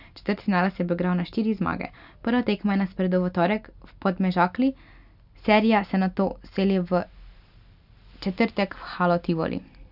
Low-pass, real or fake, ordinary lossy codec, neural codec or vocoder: 5.4 kHz; real; none; none